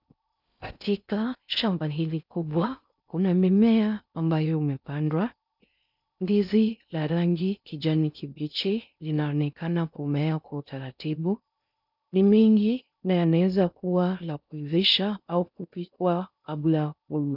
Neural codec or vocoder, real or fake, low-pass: codec, 16 kHz in and 24 kHz out, 0.6 kbps, FocalCodec, streaming, 2048 codes; fake; 5.4 kHz